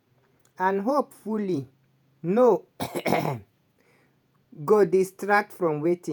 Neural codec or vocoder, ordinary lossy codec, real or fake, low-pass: vocoder, 48 kHz, 128 mel bands, Vocos; none; fake; none